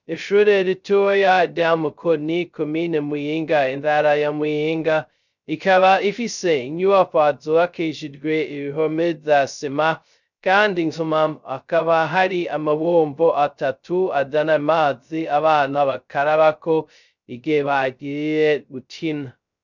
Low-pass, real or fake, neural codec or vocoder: 7.2 kHz; fake; codec, 16 kHz, 0.2 kbps, FocalCodec